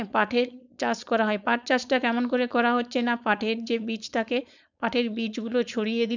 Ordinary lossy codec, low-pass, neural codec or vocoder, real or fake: none; 7.2 kHz; codec, 16 kHz, 4.8 kbps, FACodec; fake